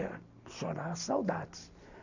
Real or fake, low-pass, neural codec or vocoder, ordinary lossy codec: real; 7.2 kHz; none; none